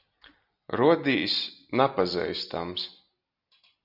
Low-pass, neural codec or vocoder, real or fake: 5.4 kHz; none; real